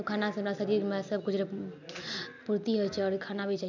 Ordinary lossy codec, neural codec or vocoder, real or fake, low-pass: none; none; real; 7.2 kHz